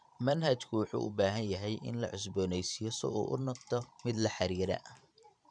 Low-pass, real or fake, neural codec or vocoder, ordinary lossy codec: 9.9 kHz; fake; vocoder, 44.1 kHz, 128 mel bands every 512 samples, BigVGAN v2; none